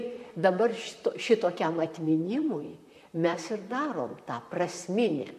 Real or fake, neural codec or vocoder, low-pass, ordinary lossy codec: fake; vocoder, 44.1 kHz, 128 mel bands, Pupu-Vocoder; 14.4 kHz; MP3, 64 kbps